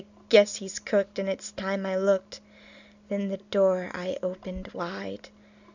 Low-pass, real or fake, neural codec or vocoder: 7.2 kHz; real; none